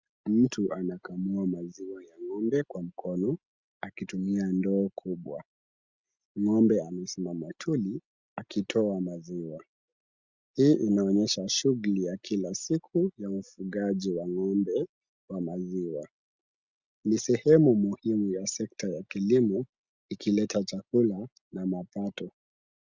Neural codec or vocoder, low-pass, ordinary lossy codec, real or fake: none; 7.2 kHz; Opus, 64 kbps; real